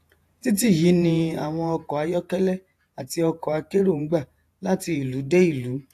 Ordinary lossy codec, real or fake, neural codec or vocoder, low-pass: AAC, 64 kbps; fake; vocoder, 48 kHz, 128 mel bands, Vocos; 14.4 kHz